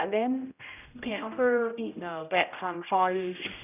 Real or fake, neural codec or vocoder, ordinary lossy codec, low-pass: fake; codec, 16 kHz, 0.5 kbps, X-Codec, HuBERT features, trained on general audio; none; 3.6 kHz